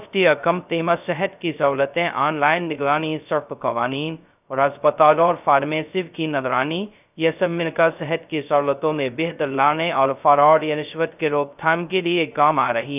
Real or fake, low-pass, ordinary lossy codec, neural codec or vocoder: fake; 3.6 kHz; none; codec, 16 kHz, 0.2 kbps, FocalCodec